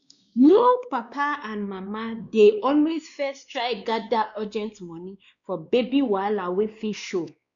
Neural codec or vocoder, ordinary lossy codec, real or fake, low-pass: codec, 16 kHz, 2 kbps, X-Codec, WavLM features, trained on Multilingual LibriSpeech; none; fake; 7.2 kHz